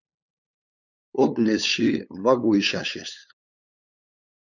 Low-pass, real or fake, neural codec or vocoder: 7.2 kHz; fake; codec, 16 kHz, 8 kbps, FunCodec, trained on LibriTTS, 25 frames a second